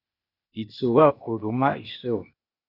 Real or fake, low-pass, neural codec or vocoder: fake; 5.4 kHz; codec, 16 kHz, 0.8 kbps, ZipCodec